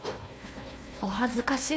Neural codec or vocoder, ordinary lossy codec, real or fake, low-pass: codec, 16 kHz, 1 kbps, FunCodec, trained on Chinese and English, 50 frames a second; none; fake; none